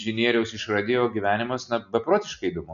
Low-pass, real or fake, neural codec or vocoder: 7.2 kHz; real; none